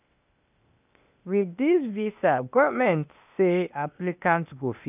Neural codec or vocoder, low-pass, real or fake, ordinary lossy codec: codec, 16 kHz, 0.8 kbps, ZipCodec; 3.6 kHz; fake; none